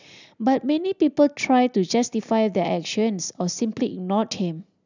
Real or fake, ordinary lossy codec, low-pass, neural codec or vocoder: real; none; 7.2 kHz; none